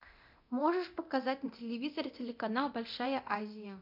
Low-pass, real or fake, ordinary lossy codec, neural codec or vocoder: 5.4 kHz; fake; MP3, 48 kbps; codec, 24 kHz, 0.9 kbps, DualCodec